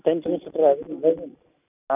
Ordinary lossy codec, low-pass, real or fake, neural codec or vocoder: none; 3.6 kHz; real; none